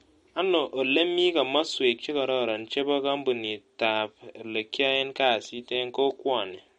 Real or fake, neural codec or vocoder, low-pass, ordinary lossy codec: real; none; 19.8 kHz; MP3, 48 kbps